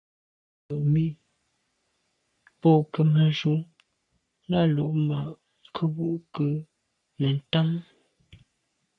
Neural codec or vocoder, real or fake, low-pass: codec, 44.1 kHz, 3.4 kbps, Pupu-Codec; fake; 10.8 kHz